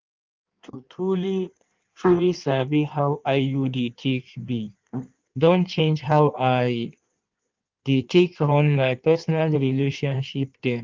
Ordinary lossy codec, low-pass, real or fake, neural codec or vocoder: Opus, 32 kbps; 7.2 kHz; fake; codec, 16 kHz in and 24 kHz out, 1.1 kbps, FireRedTTS-2 codec